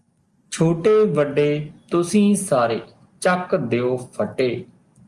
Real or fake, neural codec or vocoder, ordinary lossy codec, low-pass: real; none; Opus, 32 kbps; 10.8 kHz